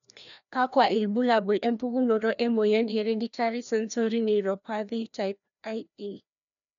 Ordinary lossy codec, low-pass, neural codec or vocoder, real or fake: none; 7.2 kHz; codec, 16 kHz, 1 kbps, FreqCodec, larger model; fake